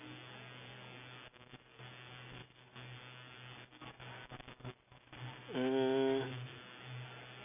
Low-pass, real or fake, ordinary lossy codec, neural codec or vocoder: 3.6 kHz; real; none; none